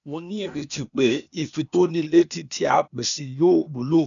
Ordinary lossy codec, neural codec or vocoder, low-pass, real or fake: none; codec, 16 kHz, 0.8 kbps, ZipCodec; 7.2 kHz; fake